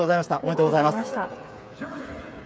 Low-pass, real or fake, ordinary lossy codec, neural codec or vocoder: none; fake; none; codec, 16 kHz, 8 kbps, FreqCodec, smaller model